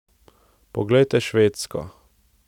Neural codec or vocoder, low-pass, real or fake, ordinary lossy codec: none; 19.8 kHz; real; none